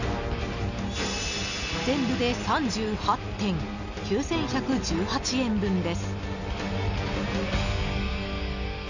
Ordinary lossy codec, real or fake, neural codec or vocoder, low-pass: none; real; none; 7.2 kHz